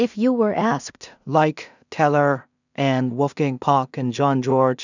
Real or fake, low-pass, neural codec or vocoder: fake; 7.2 kHz; codec, 16 kHz in and 24 kHz out, 0.4 kbps, LongCat-Audio-Codec, two codebook decoder